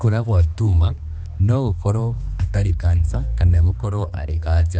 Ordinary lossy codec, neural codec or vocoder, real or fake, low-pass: none; codec, 16 kHz, 2 kbps, X-Codec, HuBERT features, trained on balanced general audio; fake; none